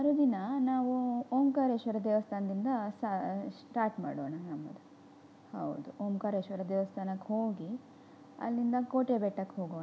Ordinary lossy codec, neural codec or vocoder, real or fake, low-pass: none; none; real; none